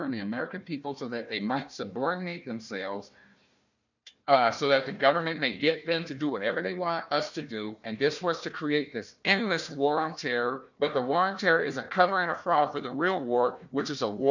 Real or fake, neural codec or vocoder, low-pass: fake; codec, 16 kHz, 1 kbps, FunCodec, trained on Chinese and English, 50 frames a second; 7.2 kHz